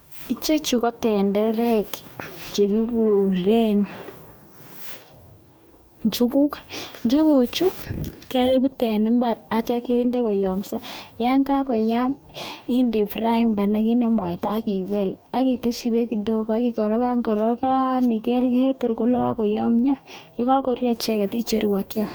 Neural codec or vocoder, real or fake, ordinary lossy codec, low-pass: codec, 44.1 kHz, 2.6 kbps, DAC; fake; none; none